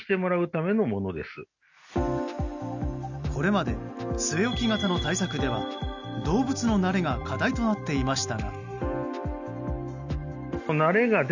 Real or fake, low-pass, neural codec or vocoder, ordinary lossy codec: real; 7.2 kHz; none; none